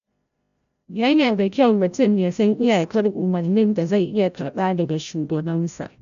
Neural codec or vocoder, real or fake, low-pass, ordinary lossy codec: codec, 16 kHz, 0.5 kbps, FreqCodec, larger model; fake; 7.2 kHz; none